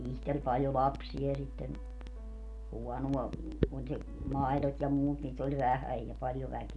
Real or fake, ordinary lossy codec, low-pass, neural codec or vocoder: real; none; 10.8 kHz; none